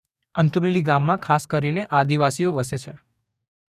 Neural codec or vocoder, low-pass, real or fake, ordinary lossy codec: codec, 44.1 kHz, 2.6 kbps, DAC; 14.4 kHz; fake; none